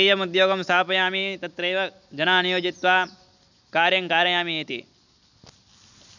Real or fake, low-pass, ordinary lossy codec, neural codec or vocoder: real; 7.2 kHz; none; none